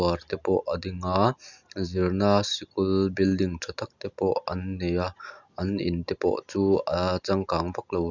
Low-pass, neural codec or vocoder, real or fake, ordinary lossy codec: 7.2 kHz; none; real; none